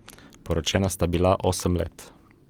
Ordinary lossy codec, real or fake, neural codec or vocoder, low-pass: Opus, 24 kbps; real; none; 19.8 kHz